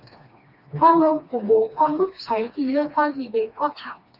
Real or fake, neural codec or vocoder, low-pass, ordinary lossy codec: fake; codec, 16 kHz, 2 kbps, FreqCodec, smaller model; 5.4 kHz; Opus, 64 kbps